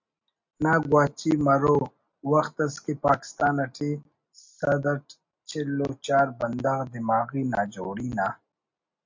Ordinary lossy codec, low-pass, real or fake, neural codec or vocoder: MP3, 64 kbps; 7.2 kHz; real; none